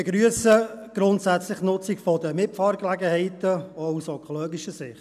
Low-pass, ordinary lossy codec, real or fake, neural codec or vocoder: 14.4 kHz; none; real; none